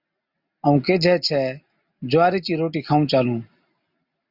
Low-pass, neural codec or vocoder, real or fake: 5.4 kHz; none; real